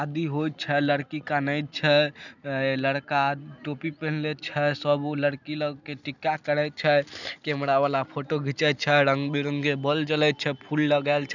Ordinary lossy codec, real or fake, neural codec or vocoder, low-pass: none; real; none; 7.2 kHz